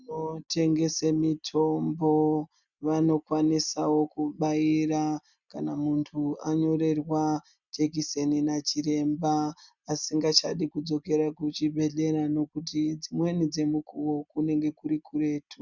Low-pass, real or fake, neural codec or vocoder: 7.2 kHz; real; none